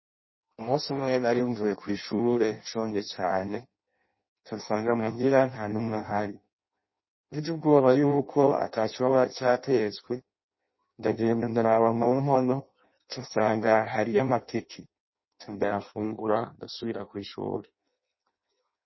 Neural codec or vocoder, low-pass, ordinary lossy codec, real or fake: codec, 16 kHz in and 24 kHz out, 0.6 kbps, FireRedTTS-2 codec; 7.2 kHz; MP3, 24 kbps; fake